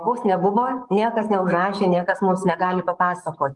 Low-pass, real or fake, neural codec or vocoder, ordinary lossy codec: 10.8 kHz; fake; codec, 44.1 kHz, 7.8 kbps, DAC; Opus, 32 kbps